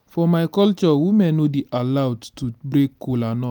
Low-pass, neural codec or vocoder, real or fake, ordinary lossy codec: none; none; real; none